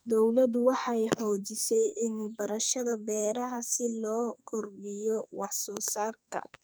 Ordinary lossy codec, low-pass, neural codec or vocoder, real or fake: none; none; codec, 44.1 kHz, 2.6 kbps, SNAC; fake